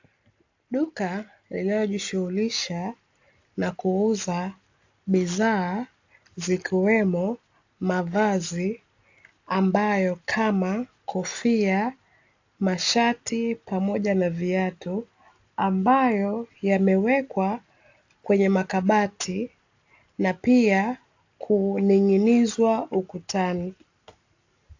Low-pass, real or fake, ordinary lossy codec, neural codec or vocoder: 7.2 kHz; real; AAC, 48 kbps; none